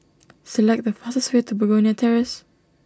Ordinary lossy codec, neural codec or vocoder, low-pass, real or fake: none; none; none; real